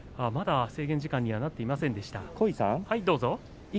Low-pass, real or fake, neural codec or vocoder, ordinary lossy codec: none; real; none; none